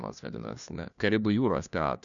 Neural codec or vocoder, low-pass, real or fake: codec, 16 kHz, 1 kbps, FunCodec, trained on LibriTTS, 50 frames a second; 7.2 kHz; fake